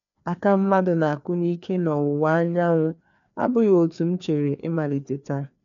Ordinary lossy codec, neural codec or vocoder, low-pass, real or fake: none; codec, 16 kHz, 2 kbps, FreqCodec, larger model; 7.2 kHz; fake